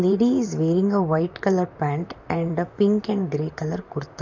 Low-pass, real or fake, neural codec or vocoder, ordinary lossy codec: 7.2 kHz; fake; vocoder, 44.1 kHz, 128 mel bands, Pupu-Vocoder; none